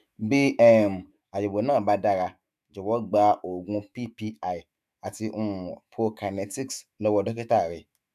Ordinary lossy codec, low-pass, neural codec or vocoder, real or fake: none; 14.4 kHz; autoencoder, 48 kHz, 128 numbers a frame, DAC-VAE, trained on Japanese speech; fake